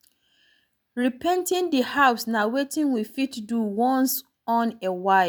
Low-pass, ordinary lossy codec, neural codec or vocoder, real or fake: none; none; none; real